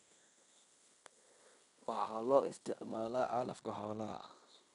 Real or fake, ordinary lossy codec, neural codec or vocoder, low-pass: fake; none; codec, 16 kHz in and 24 kHz out, 0.9 kbps, LongCat-Audio-Codec, fine tuned four codebook decoder; 10.8 kHz